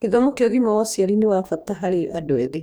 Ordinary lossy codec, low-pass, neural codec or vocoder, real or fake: none; none; codec, 44.1 kHz, 2.6 kbps, DAC; fake